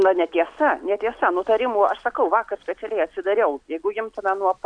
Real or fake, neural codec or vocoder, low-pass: real; none; 9.9 kHz